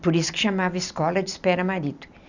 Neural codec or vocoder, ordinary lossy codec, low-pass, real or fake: none; none; 7.2 kHz; real